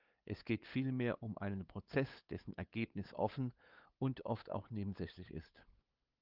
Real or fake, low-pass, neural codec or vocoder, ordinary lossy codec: fake; 5.4 kHz; codec, 16 kHz, 8 kbps, FunCodec, trained on LibriTTS, 25 frames a second; Opus, 24 kbps